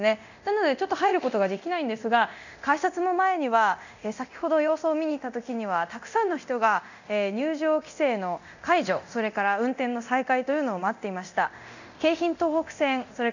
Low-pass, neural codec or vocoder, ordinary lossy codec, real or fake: 7.2 kHz; codec, 24 kHz, 0.9 kbps, DualCodec; none; fake